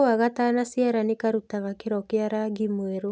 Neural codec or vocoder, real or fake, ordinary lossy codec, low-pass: none; real; none; none